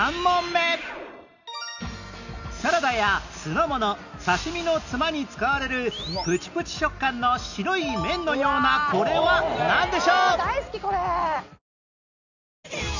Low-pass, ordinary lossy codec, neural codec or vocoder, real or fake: 7.2 kHz; none; none; real